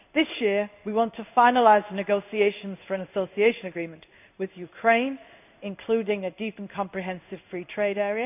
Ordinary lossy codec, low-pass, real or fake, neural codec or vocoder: none; 3.6 kHz; fake; codec, 16 kHz in and 24 kHz out, 1 kbps, XY-Tokenizer